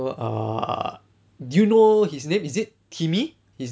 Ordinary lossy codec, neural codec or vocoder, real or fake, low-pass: none; none; real; none